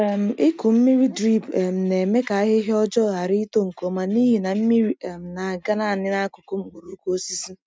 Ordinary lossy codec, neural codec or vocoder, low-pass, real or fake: none; none; none; real